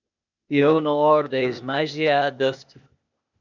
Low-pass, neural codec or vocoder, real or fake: 7.2 kHz; codec, 16 kHz, 0.8 kbps, ZipCodec; fake